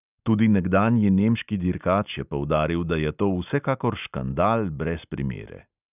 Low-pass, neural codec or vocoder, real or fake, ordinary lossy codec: 3.6 kHz; none; real; none